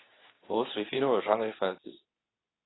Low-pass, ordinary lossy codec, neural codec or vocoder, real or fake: 7.2 kHz; AAC, 16 kbps; codec, 24 kHz, 0.9 kbps, WavTokenizer, medium speech release version 2; fake